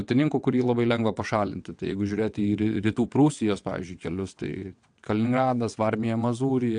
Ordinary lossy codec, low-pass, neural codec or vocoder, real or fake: AAC, 64 kbps; 9.9 kHz; vocoder, 22.05 kHz, 80 mel bands, WaveNeXt; fake